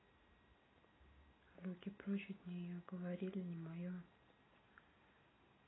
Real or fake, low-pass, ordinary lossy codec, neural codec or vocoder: real; 7.2 kHz; AAC, 16 kbps; none